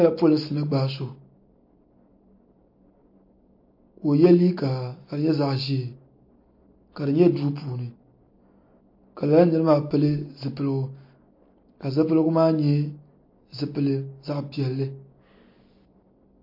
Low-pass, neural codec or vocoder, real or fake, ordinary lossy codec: 5.4 kHz; none; real; MP3, 32 kbps